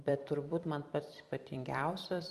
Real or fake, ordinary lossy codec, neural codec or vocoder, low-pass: fake; Opus, 32 kbps; vocoder, 44.1 kHz, 128 mel bands every 256 samples, BigVGAN v2; 14.4 kHz